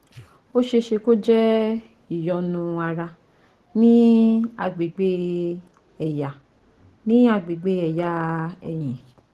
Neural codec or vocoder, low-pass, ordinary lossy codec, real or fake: none; 14.4 kHz; Opus, 16 kbps; real